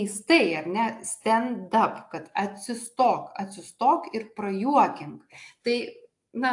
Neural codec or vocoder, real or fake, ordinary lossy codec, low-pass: none; real; AAC, 64 kbps; 10.8 kHz